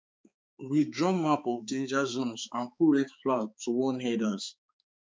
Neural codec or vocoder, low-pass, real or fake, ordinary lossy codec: codec, 16 kHz, 4 kbps, X-Codec, HuBERT features, trained on balanced general audio; none; fake; none